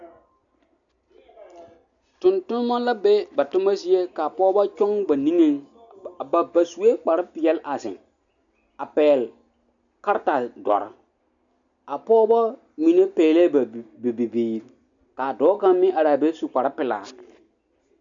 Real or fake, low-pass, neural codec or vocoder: real; 7.2 kHz; none